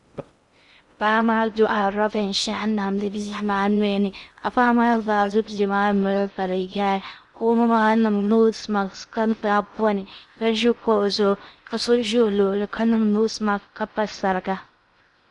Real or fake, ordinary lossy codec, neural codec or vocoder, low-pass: fake; Opus, 64 kbps; codec, 16 kHz in and 24 kHz out, 0.6 kbps, FocalCodec, streaming, 2048 codes; 10.8 kHz